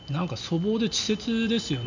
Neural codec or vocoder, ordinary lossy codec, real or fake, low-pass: none; none; real; 7.2 kHz